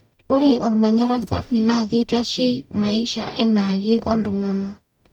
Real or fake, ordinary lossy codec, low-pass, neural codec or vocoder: fake; none; 19.8 kHz; codec, 44.1 kHz, 0.9 kbps, DAC